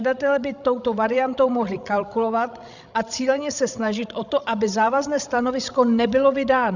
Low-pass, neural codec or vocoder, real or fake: 7.2 kHz; codec, 16 kHz, 16 kbps, FreqCodec, larger model; fake